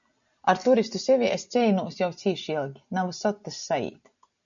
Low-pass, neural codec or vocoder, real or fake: 7.2 kHz; none; real